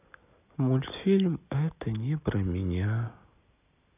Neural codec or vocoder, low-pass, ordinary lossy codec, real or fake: none; 3.6 kHz; none; real